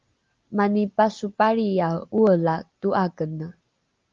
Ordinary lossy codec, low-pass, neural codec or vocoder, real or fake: Opus, 32 kbps; 7.2 kHz; none; real